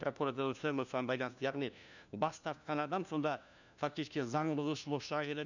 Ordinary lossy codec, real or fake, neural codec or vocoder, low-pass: none; fake; codec, 16 kHz, 1 kbps, FunCodec, trained on LibriTTS, 50 frames a second; 7.2 kHz